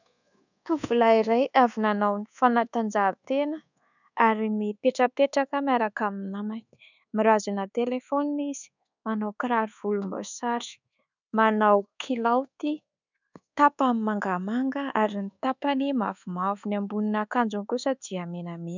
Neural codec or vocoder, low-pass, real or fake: codec, 24 kHz, 1.2 kbps, DualCodec; 7.2 kHz; fake